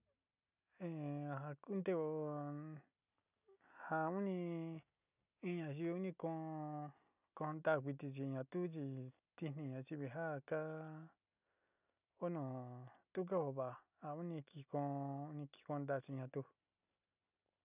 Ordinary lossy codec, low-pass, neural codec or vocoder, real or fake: none; 3.6 kHz; none; real